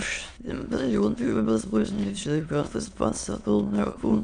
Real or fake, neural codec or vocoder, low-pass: fake; autoencoder, 22.05 kHz, a latent of 192 numbers a frame, VITS, trained on many speakers; 9.9 kHz